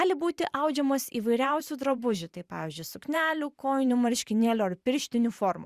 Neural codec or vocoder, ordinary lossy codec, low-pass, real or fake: vocoder, 44.1 kHz, 128 mel bands every 512 samples, BigVGAN v2; Opus, 64 kbps; 14.4 kHz; fake